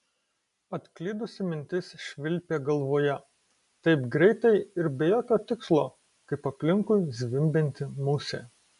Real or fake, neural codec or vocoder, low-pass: real; none; 10.8 kHz